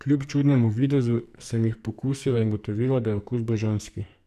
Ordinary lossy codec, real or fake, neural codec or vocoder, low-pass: none; fake; codec, 44.1 kHz, 2.6 kbps, SNAC; 14.4 kHz